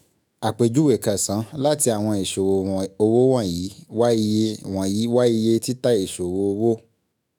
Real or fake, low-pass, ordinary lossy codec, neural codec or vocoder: fake; none; none; autoencoder, 48 kHz, 128 numbers a frame, DAC-VAE, trained on Japanese speech